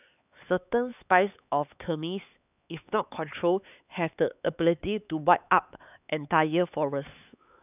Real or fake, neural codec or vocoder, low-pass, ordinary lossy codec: fake; codec, 16 kHz, 4 kbps, X-Codec, HuBERT features, trained on LibriSpeech; 3.6 kHz; none